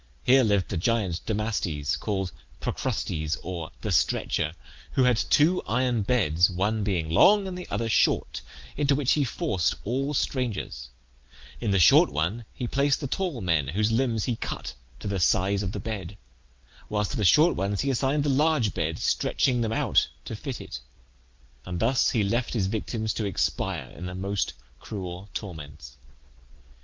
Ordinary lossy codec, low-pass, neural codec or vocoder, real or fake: Opus, 32 kbps; 7.2 kHz; none; real